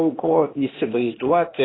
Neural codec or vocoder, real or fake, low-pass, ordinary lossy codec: codec, 16 kHz, 0.8 kbps, ZipCodec; fake; 7.2 kHz; AAC, 16 kbps